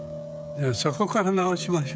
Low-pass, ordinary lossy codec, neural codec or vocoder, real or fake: none; none; codec, 16 kHz, 16 kbps, FreqCodec, smaller model; fake